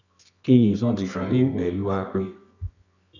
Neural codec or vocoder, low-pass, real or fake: codec, 24 kHz, 0.9 kbps, WavTokenizer, medium music audio release; 7.2 kHz; fake